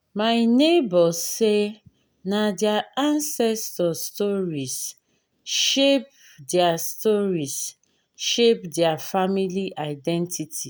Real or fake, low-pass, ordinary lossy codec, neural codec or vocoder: real; none; none; none